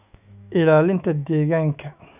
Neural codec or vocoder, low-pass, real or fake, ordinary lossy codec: autoencoder, 48 kHz, 128 numbers a frame, DAC-VAE, trained on Japanese speech; 3.6 kHz; fake; none